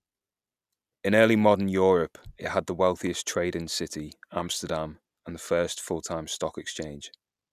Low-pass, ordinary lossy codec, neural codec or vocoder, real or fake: 14.4 kHz; none; none; real